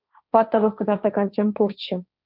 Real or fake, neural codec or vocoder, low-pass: fake; codec, 16 kHz, 1.1 kbps, Voila-Tokenizer; 5.4 kHz